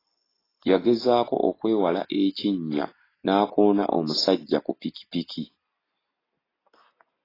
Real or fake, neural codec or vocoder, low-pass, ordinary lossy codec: real; none; 5.4 kHz; AAC, 24 kbps